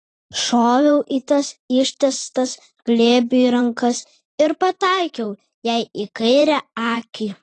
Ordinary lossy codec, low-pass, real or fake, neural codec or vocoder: AAC, 32 kbps; 10.8 kHz; fake; vocoder, 44.1 kHz, 128 mel bands every 512 samples, BigVGAN v2